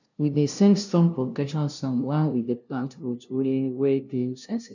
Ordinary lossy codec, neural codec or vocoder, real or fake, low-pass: none; codec, 16 kHz, 0.5 kbps, FunCodec, trained on LibriTTS, 25 frames a second; fake; 7.2 kHz